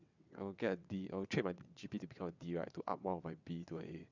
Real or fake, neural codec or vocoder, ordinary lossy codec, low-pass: fake; vocoder, 22.05 kHz, 80 mel bands, WaveNeXt; none; 7.2 kHz